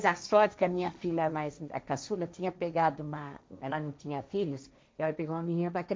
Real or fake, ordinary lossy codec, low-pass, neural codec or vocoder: fake; none; none; codec, 16 kHz, 1.1 kbps, Voila-Tokenizer